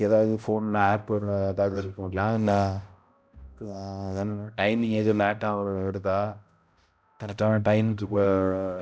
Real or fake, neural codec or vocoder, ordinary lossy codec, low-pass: fake; codec, 16 kHz, 0.5 kbps, X-Codec, HuBERT features, trained on balanced general audio; none; none